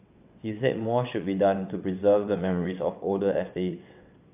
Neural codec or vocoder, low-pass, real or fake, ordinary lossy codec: vocoder, 22.05 kHz, 80 mel bands, WaveNeXt; 3.6 kHz; fake; AAC, 32 kbps